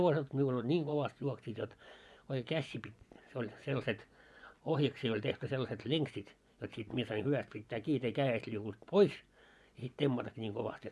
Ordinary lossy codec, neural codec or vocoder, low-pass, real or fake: none; vocoder, 24 kHz, 100 mel bands, Vocos; none; fake